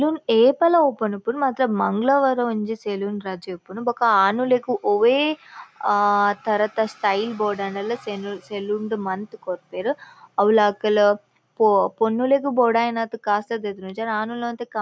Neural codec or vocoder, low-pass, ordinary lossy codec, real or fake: none; none; none; real